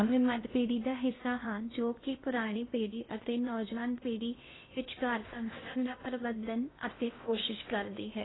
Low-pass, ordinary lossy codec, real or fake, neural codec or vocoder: 7.2 kHz; AAC, 16 kbps; fake; codec, 16 kHz in and 24 kHz out, 0.6 kbps, FocalCodec, streaming, 4096 codes